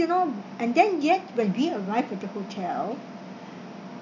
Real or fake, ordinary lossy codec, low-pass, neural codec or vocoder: real; none; 7.2 kHz; none